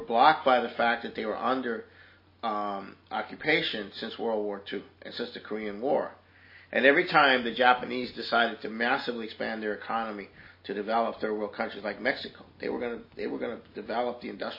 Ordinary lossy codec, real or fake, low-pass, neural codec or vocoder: MP3, 24 kbps; real; 5.4 kHz; none